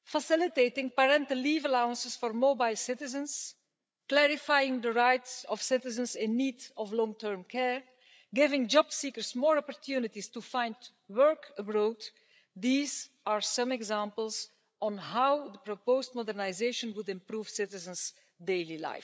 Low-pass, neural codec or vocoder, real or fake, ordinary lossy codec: none; codec, 16 kHz, 8 kbps, FreqCodec, larger model; fake; none